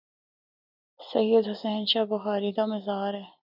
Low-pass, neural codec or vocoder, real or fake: 5.4 kHz; none; real